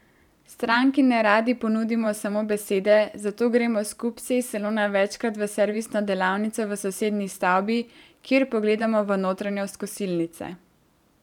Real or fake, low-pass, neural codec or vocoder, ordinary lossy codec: fake; 19.8 kHz; vocoder, 44.1 kHz, 128 mel bands every 512 samples, BigVGAN v2; none